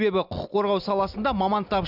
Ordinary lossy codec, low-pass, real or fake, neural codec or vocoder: none; 5.4 kHz; real; none